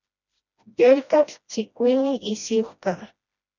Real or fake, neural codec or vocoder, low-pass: fake; codec, 16 kHz, 1 kbps, FreqCodec, smaller model; 7.2 kHz